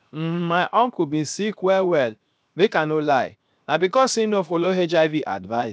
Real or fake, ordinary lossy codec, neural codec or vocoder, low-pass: fake; none; codec, 16 kHz, 0.7 kbps, FocalCodec; none